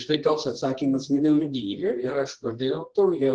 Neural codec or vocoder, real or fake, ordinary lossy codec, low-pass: codec, 24 kHz, 0.9 kbps, WavTokenizer, medium music audio release; fake; Opus, 16 kbps; 9.9 kHz